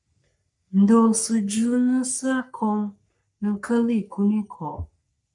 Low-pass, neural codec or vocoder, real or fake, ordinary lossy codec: 10.8 kHz; codec, 44.1 kHz, 3.4 kbps, Pupu-Codec; fake; MP3, 96 kbps